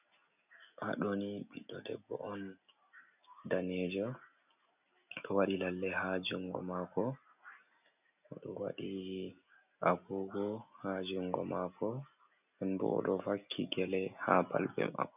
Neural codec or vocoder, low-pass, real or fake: none; 3.6 kHz; real